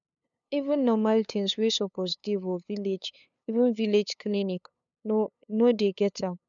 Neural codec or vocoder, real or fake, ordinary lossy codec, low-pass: codec, 16 kHz, 2 kbps, FunCodec, trained on LibriTTS, 25 frames a second; fake; none; 7.2 kHz